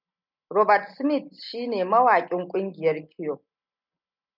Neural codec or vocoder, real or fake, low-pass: none; real; 5.4 kHz